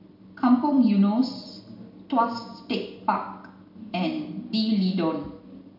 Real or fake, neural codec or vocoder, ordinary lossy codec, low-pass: real; none; MP3, 48 kbps; 5.4 kHz